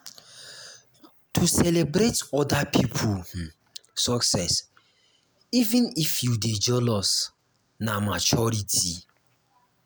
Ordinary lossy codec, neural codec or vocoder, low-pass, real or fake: none; none; none; real